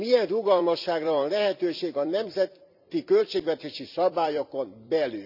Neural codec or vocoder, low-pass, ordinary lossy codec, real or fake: vocoder, 44.1 kHz, 128 mel bands every 256 samples, BigVGAN v2; 5.4 kHz; none; fake